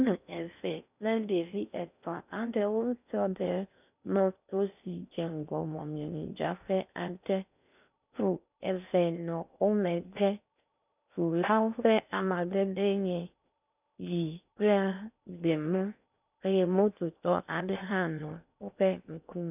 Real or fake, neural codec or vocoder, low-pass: fake; codec, 16 kHz in and 24 kHz out, 0.6 kbps, FocalCodec, streaming, 4096 codes; 3.6 kHz